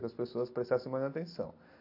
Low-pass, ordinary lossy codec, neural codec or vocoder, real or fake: 5.4 kHz; AAC, 32 kbps; none; real